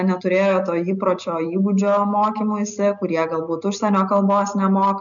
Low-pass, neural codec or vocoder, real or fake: 7.2 kHz; none; real